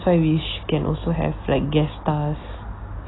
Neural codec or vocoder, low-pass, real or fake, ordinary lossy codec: none; 7.2 kHz; real; AAC, 16 kbps